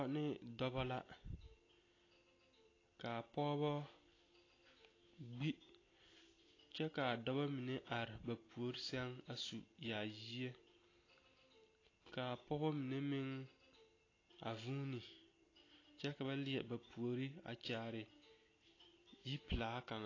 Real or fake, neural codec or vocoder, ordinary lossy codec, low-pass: real; none; AAC, 32 kbps; 7.2 kHz